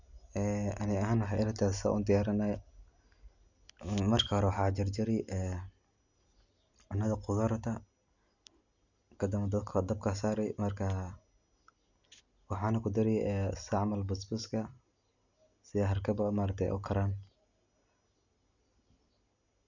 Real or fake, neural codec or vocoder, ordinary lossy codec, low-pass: real; none; none; 7.2 kHz